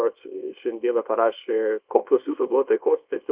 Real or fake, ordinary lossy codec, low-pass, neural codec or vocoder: fake; Opus, 32 kbps; 3.6 kHz; codec, 24 kHz, 0.9 kbps, WavTokenizer, small release